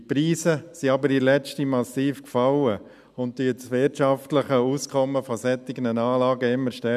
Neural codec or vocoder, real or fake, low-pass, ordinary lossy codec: none; real; 14.4 kHz; none